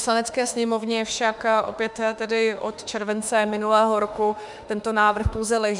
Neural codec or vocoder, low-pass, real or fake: autoencoder, 48 kHz, 32 numbers a frame, DAC-VAE, trained on Japanese speech; 10.8 kHz; fake